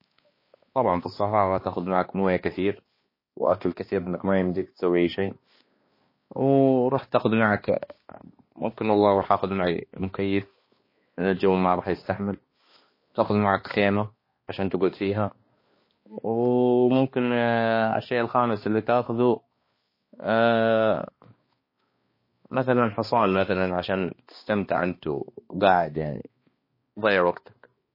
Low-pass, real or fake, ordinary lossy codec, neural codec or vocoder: 5.4 kHz; fake; MP3, 24 kbps; codec, 16 kHz, 2 kbps, X-Codec, HuBERT features, trained on balanced general audio